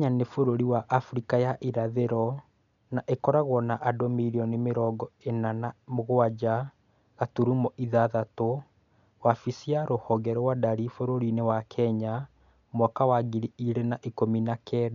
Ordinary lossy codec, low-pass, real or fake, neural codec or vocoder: none; 7.2 kHz; real; none